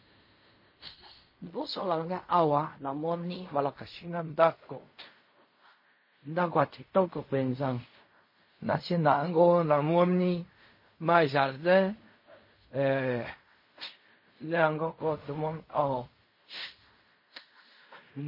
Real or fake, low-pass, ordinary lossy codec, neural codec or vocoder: fake; 5.4 kHz; MP3, 24 kbps; codec, 16 kHz in and 24 kHz out, 0.4 kbps, LongCat-Audio-Codec, fine tuned four codebook decoder